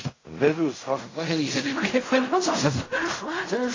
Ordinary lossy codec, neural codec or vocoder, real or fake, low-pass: AAC, 32 kbps; codec, 16 kHz in and 24 kHz out, 0.4 kbps, LongCat-Audio-Codec, fine tuned four codebook decoder; fake; 7.2 kHz